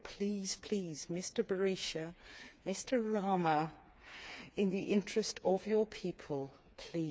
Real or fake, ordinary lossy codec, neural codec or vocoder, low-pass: fake; none; codec, 16 kHz, 4 kbps, FreqCodec, smaller model; none